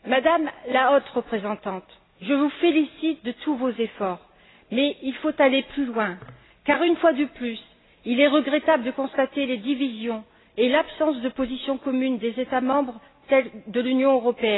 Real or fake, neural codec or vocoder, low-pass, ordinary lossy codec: real; none; 7.2 kHz; AAC, 16 kbps